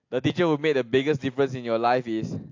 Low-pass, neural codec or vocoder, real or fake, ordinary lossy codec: 7.2 kHz; none; real; AAC, 48 kbps